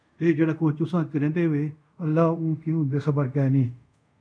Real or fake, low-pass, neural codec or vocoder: fake; 9.9 kHz; codec, 24 kHz, 0.5 kbps, DualCodec